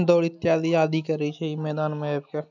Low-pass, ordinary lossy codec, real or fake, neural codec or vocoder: 7.2 kHz; none; real; none